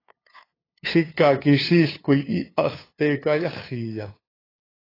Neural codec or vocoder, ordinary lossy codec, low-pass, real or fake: codec, 16 kHz, 2 kbps, FunCodec, trained on LibriTTS, 25 frames a second; AAC, 24 kbps; 5.4 kHz; fake